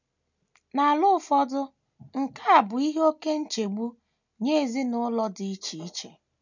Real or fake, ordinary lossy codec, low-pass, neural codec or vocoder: real; none; 7.2 kHz; none